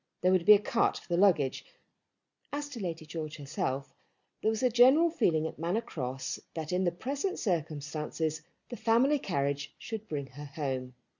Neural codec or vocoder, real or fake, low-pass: none; real; 7.2 kHz